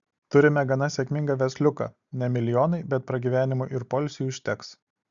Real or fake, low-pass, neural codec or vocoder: real; 7.2 kHz; none